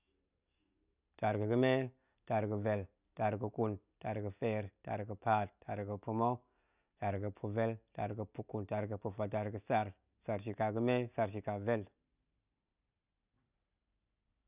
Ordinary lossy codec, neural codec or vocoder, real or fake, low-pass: none; none; real; 3.6 kHz